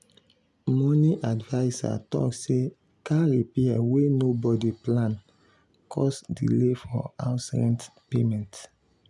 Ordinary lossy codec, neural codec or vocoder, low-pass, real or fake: none; none; none; real